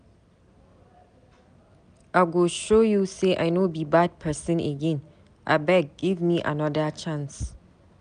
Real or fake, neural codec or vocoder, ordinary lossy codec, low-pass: real; none; none; 9.9 kHz